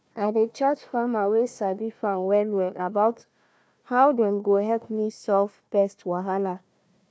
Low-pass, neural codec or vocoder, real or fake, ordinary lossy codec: none; codec, 16 kHz, 1 kbps, FunCodec, trained on Chinese and English, 50 frames a second; fake; none